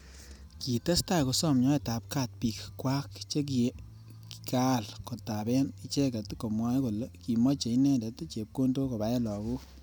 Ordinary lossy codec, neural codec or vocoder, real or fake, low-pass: none; none; real; none